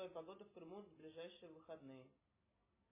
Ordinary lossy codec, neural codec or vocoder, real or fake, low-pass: MP3, 16 kbps; none; real; 3.6 kHz